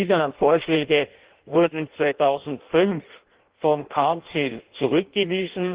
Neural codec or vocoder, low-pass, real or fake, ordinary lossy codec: codec, 16 kHz in and 24 kHz out, 0.6 kbps, FireRedTTS-2 codec; 3.6 kHz; fake; Opus, 16 kbps